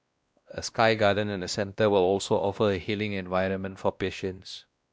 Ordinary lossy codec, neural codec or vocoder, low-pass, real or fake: none; codec, 16 kHz, 1 kbps, X-Codec, WavLM features, trained on Multilingual LibriSpeech; none; fake